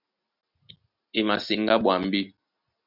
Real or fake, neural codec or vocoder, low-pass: real; none; 5.4 kHz